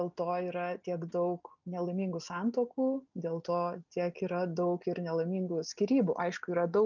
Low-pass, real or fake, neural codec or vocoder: 7.2 kHz; real; none